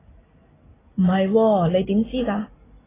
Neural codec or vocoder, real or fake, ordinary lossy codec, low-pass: none; real; AAC, 16 kbps; 3.6 kHz